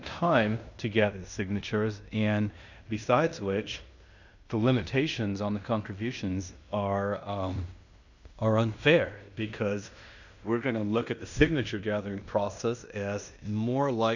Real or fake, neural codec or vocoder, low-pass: fake; codec, 16 kHz in and 24 kHz out, 0.9 kbps, LongCat-Audio-Codec, fine tuned four codebook decoder; 7.2 kHz